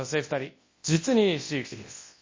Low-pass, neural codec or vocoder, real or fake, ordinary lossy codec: 7.2 kHz; codec, 24 kHz, 0.9 kbps, WavTokenizer, large speech release; fake; MP3, 32 kbps